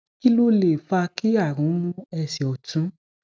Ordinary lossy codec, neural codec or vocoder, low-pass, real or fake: none; none; none; real